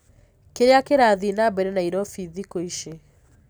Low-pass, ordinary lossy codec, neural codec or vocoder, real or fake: none; none; none; real